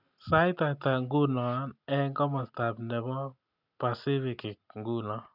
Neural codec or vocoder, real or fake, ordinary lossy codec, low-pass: none; real; none; 5.4 kHz